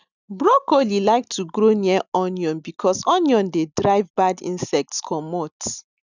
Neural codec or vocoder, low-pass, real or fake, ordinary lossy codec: none; 7.2 kHz; real; none